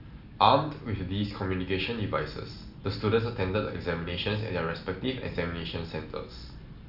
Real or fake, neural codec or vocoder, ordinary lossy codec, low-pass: real; none; none; 5.4 kHz